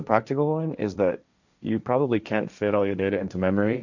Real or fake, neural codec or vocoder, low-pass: fake; codec, 16 kHz, 1.1 kbps, Voila-Tokenizer; 7.2 kHz